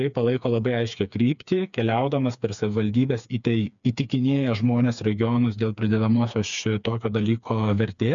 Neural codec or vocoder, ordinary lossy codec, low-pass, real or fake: codec, 16 kHz, 4 kbps, FreqCodec, smaller model; MP3, 96 kbps; 7.2 kHz; fake